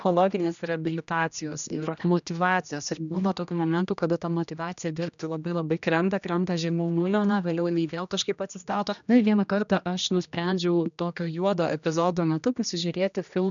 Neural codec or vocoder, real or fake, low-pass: codec, 16 kHz, 1 kbps, X-Codec, HuBERT features, trained on general audio; fake; 7.2 kHz